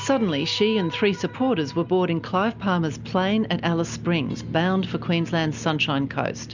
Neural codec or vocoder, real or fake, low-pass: none; real; 7.2 kHz